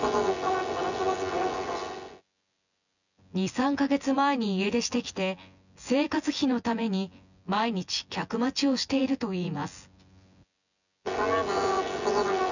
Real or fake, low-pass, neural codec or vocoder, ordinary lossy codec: fake; 7.2 kHz; vocoder, 24 kHz, 100 mel bands, Vocos; none